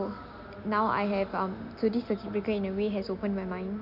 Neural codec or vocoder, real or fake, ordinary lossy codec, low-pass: none; real; none; 5.4 kHz